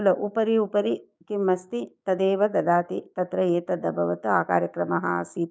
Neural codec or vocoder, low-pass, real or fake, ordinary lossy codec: codec, 16 kHz, 6 kbps, DAC; none; fake; none